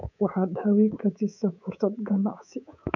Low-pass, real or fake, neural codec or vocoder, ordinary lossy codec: 7.2 kHz; fake; codec, 24 kHz, 3.1 kbps, DualCodec; none